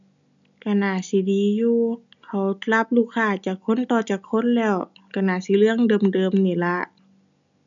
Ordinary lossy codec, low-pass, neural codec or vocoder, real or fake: none; 7.2 kHz; none; real